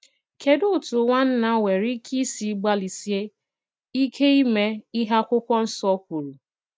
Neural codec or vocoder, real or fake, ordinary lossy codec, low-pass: none; real; none; none